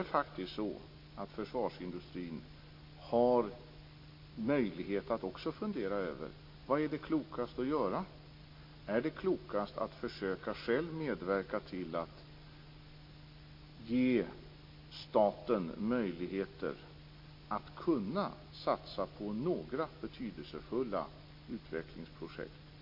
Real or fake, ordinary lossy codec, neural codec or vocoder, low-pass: real; MP3, 32 kbps; none; 5.4 kHz